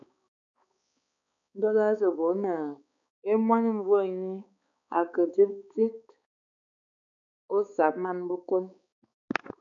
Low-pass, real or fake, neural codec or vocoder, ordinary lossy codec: 7.2 kHz; fake; codec, 16 kHz, 4 kbps, X-Codec, HuBERT features, trained on balanced general audio; MP3, 64 kbps